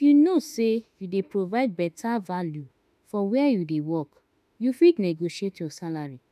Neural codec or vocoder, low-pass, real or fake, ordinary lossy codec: autoencoder, 48 kHz, 32 numbers a frame, DAC-VAE, trained on Japanese speech; 14.4 kHz; fake; none